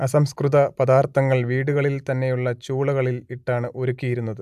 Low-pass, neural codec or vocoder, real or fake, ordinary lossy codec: 14.4 kHz; none; real; AAC, 96 kbps